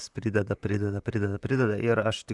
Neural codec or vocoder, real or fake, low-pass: vocoder, 44.1 kHz, 128 mel bands, Pupu-Vocoder; fake; 10.8 kHz